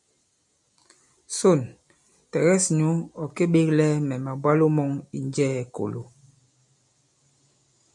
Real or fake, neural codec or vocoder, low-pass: real; none; 10.8 kHz